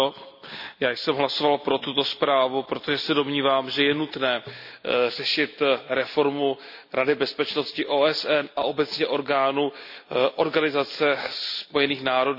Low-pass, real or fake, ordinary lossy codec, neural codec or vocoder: 5.4 kHz; real; none; none